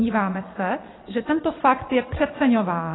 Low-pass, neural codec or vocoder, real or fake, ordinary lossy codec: 7.2 kHz; vocoder, 22.05 kHz, 80 mel bands, Vocos; fake; AAC, 16 kbps